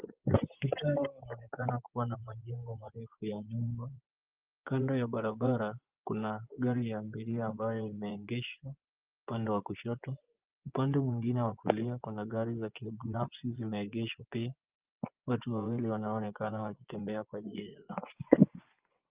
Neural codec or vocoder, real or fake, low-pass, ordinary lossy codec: vocoder, 24 kHz, 100 mel bands, Vocos; fake; 3.6 kHz; Opus, 24 kbps